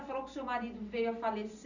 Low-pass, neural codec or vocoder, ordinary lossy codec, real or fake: 7.2 kHz; none; none; real